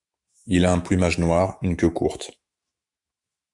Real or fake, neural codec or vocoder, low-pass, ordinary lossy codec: fake; autoencoder, 48 kHz, 128 numbers a frame, DAC-VAE, trained on Japanese speech; 10.8 kHz; Opus, 64 kbps